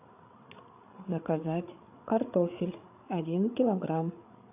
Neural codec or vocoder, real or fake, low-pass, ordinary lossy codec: codec, 16 kHz, 8 kbps, FreqCodec, larger model; fake; 3.6 kHz; AAC, 32 kbps